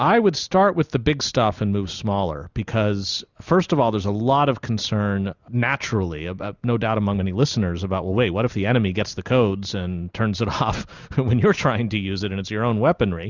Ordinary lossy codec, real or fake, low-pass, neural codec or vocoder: Opus, 64 kbps; real; 7.2 kHz; none